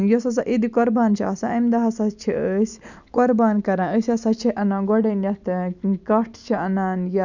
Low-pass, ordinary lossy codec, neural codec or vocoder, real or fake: 7.2 kHz; none; none; real